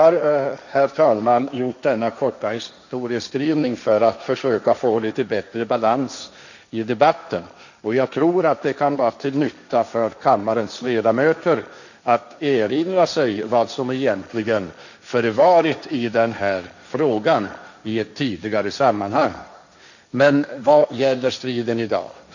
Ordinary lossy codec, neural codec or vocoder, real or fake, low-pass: none; codec, 16 kHz, 1.1 kbps, Voila-Tokenizer; fake; 7.2 kHz